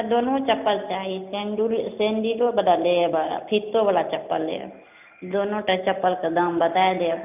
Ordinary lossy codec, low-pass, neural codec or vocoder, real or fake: none; 3.6 kHz; none; real